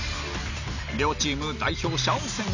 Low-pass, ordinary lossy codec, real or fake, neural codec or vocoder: 7.2 kHz; none; real; none